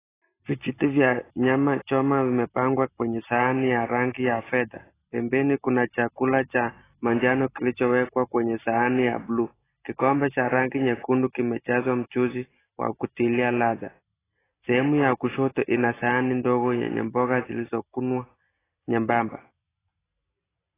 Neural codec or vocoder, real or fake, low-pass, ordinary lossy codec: none; real; 3.6 kHz; AAC, 16 kbps